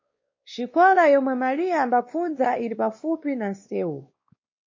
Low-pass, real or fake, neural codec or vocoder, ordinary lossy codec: 7.2 kHz; fake; codec, 16 kHz, 2 kbps, X-Codec, WavLM features, trained on Multilingual LibriSpeech; MP3, 32 kbps